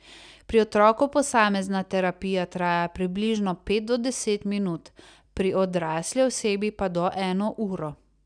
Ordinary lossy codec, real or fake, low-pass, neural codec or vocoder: none; real; 9.9 kHz; none